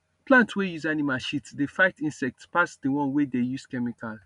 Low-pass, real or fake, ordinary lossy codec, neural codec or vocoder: 10.8 kHz; real; none; none